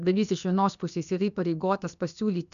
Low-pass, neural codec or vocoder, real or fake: 7.2 kHz; codec, 16 kHz, about 1 kbps, DyCAST, with the encoder's durations; fake